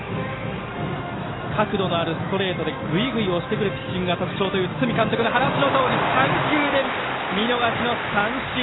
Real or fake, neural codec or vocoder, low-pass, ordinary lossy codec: real; none; 7.2 kHz; AAC, 16 kbps